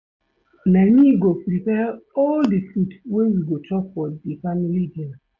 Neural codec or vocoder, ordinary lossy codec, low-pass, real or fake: none; AAC, 48 kbps; 7.2 kHz; real